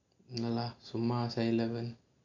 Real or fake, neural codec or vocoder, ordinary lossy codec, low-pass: real; none; none; 7.2 kHz